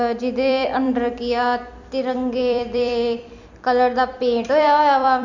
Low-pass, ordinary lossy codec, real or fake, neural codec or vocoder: 7.2 kHz; none; fake; vocoder, 44.1 kHz, 128 mel bands every 256 samples, BigVGAN v2